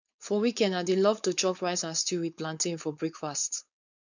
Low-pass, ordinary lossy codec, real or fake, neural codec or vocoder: 7.2 kHz; none; fake; codec, 16 kHz, 4.8 kbps, FACodec